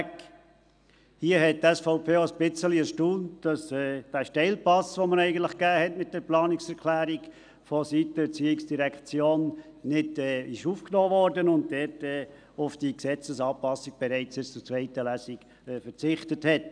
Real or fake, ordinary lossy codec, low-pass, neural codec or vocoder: real; none; 9.9 kHz; none